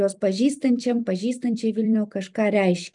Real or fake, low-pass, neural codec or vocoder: fake; 10.8 kHz; vocoder, 44.1 kHz, 128 mel bands every 256 samples, BigVGAN v2